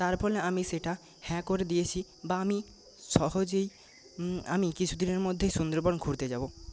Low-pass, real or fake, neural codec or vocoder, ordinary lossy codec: none; real; none; none